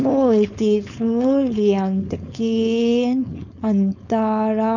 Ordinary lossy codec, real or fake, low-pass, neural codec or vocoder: none; fake; 7.2 kHz; codec, 16 kHz, 4.8 kbps, FACodec